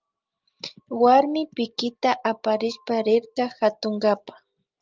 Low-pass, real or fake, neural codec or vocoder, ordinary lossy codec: 7.2 kHz; real; none; Opus, 24 kbps